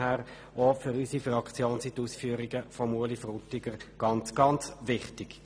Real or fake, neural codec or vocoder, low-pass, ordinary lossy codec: real; none; none; none